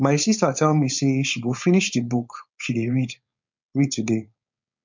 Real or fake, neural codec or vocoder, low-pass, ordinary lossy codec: fake; codec, 16 kHz, 4.8 kbps, FACodec; 7.2 kHz; MP3, 64 kbps